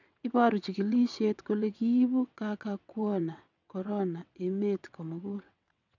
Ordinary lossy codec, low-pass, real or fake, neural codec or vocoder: none; 7.2 kHz; fake; vocoder, 22.05 kHz, 80 mel bands, WaveNeXt